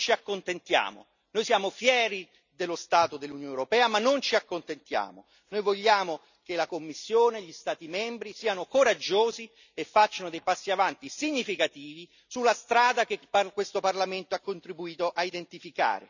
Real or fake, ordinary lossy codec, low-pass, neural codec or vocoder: real; none; 7.2 kHz; none